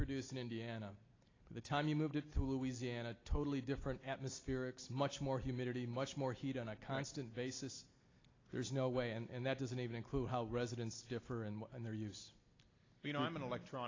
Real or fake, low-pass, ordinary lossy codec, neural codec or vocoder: real; 7.2 kHz; AAC, 32 kbps; none